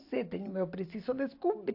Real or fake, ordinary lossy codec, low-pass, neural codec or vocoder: real; AAC, 32 kbps; 5.4 kHz; none